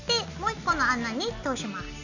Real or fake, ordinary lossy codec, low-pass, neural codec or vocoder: real; none; 7.2 kHz; none